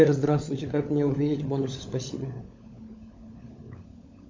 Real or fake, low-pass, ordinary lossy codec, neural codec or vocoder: fake; 7.2 kHz; MP3, 48 kbps; codec, 16 kHz, 16 kbps, FunCodec, trained on LibriTTS, 50 frames a second